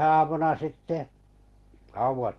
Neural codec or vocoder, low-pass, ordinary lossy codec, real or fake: none; 19.8 kHz; Opus, 16 kbps; real